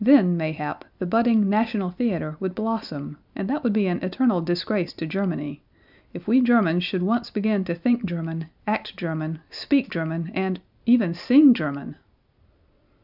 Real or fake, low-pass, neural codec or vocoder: real; 5.4 kHz; none